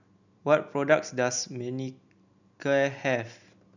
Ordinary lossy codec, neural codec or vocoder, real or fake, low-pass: none; none; real; 7.2 kHz